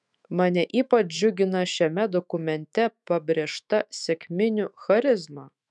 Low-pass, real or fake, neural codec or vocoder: 10.8 kHz; fake; autoencoder, 48 kHz, 128 numbers a frame, DAC-VAE, trained on Japanese speech